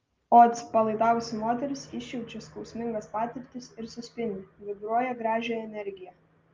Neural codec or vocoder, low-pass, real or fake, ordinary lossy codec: none; 7.2 kHz; real; Opus, 24 kbps